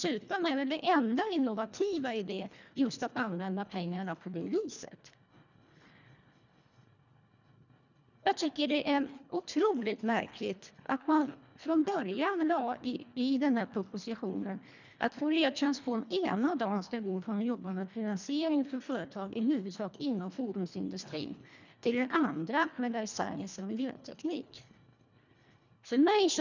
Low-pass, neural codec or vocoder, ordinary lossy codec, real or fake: 7.2 kHz; codec, 24 kHz, 1.5 kbps, HILCodec; none; fake